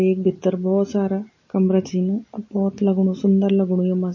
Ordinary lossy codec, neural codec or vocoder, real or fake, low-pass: MP3, 32 kbps; none; real; 7.2 kHz